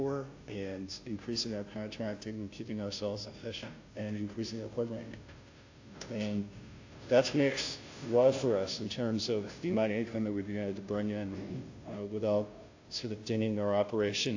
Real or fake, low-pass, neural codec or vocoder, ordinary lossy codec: fake; 7.2 kHz; codec, 16 kHz, 0.5 kbps, FunCodec, trained on Chinese and English, 25 frames a second; AAC, 48 kbps